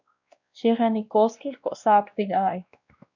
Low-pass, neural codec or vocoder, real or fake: 7.2 kHz; codec, 16 kHz, 1 kbps, X-Codec, WavLM features, trained on Multilingual LibriSpeech; fake